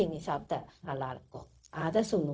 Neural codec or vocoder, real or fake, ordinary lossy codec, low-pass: codec, 16 kHz, 0.4 kbps, LongCat-Audio-Codec; fake; none; none